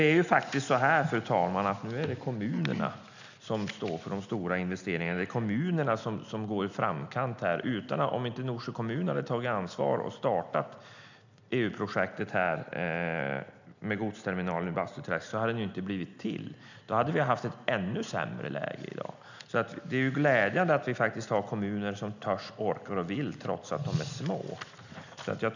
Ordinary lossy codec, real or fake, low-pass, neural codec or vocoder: none; real; 7.2 kHz; none